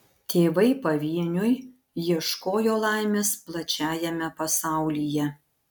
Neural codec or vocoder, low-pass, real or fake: none; 19.8 kHz; real